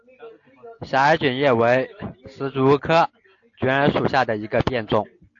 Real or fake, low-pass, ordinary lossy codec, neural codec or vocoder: real; 7.2 kHz; AAC, 48 kbps; none